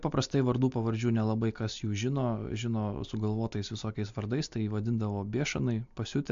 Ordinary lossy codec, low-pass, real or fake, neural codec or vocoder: AAC, 64 kbps; 7.2 kHz; real; none